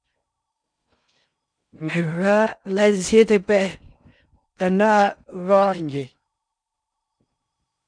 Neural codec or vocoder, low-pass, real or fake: codec, 16 kHz in and 24 kHz out, 0.6 kbps, FocalCodec, streaming, 4096 codes; 9.9 kHz; fake